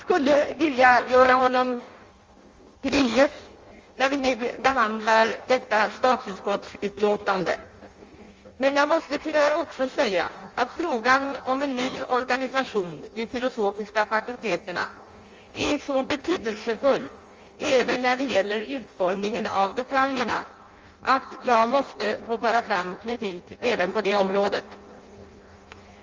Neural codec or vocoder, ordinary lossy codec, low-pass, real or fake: codec, 16 kHz in and 24 kHz out, 0.6 kbps, FireRedTTS-2 codec; Opus, 32 kbps; 7.2 kHz; fake